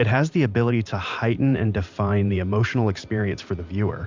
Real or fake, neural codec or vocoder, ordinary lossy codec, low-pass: fake; vocoder, 44.1 kHz, 128 mel bands every 512 samples, BigVGAN v2; MP3, 64 kbps; 7.2 kHz